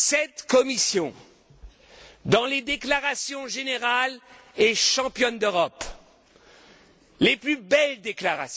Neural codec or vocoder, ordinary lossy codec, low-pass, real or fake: none; none; none; real